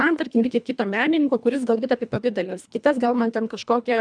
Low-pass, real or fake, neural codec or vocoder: 9.9 kHz; fake; codec, 24 kHz, 1.5 kbps, HILCodec